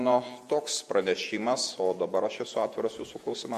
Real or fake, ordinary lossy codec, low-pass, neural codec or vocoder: fake; MP3, 64 kbps; 14.4 kHz; vocoder, 48 kHz, 128 mel bands, Vocos